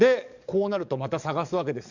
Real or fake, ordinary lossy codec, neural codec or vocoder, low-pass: fake; none; codec, 44.1 kHz, 7.8 kbps, DAC; 7.2 kHz